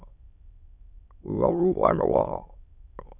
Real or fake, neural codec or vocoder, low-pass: fake; autoencoder, 22.05 kHz, a latent of 192 numbers a frame, VITS, trained on many speakers; 3.6 kHz